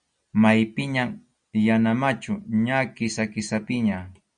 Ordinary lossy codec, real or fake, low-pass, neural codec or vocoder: Opus, 64 kbps; real; 9.9 kHz; none